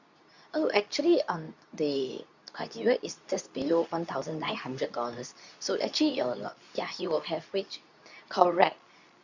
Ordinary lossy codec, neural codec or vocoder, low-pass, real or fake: none; codec, 24 kHz, 0.9 kbps, WavTokenizer, medium speech release version 2; 7.2 kHz; fake